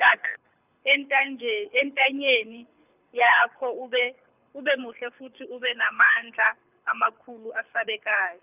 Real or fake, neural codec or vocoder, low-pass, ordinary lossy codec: fake; codec, 24 kHz, 6 kbps, HILCodec; 3.6 kHz; none